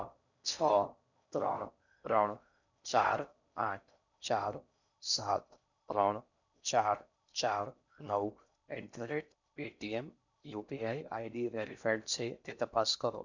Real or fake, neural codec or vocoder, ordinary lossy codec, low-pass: fake; codec, 16 kHz in and 24 kHz out, 0.6 kbps, FocalCodec, streaming, 4096 codes; none; 7.2 kHz